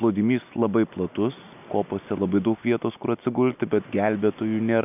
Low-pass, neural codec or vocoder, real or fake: 3.6 kHz; none; real